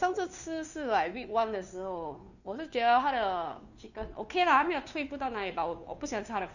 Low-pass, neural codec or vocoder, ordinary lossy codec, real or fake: 7.2 kHz; codec, 16 kHz, 2 kbps, FunCodec, trained on Chinese and English, 25 frames a second; none; fake